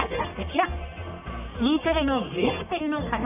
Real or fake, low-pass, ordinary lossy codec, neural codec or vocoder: fake; 3.6 kHz; none; codec, 44.1 kHz, 1.7 kbps, Pupu-Codec